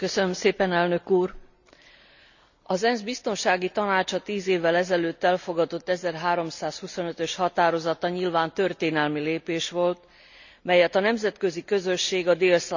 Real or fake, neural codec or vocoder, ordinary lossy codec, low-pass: real; none; none; 7.2 kHz